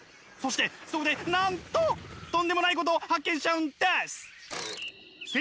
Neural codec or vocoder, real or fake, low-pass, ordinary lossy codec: none; real; none; none